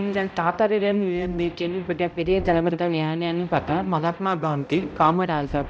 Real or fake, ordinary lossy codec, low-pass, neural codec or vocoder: fake; none; none; codec, 16 kHz, 0.5 kbps, X-Codec, HuBERT features, trained on balanced general audio